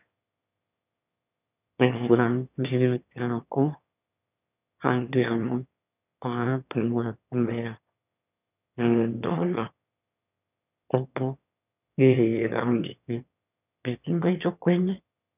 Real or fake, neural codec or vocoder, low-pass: fake; autoencoder, 22.05 kHz, a latent of 192 numbers a frame, VITS, trained on one speaker; 3.6 kHz